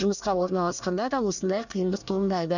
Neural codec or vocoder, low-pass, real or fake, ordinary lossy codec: codec, 24 kHz, 1 kbps, SNAC; 7.2 kHz; fake; none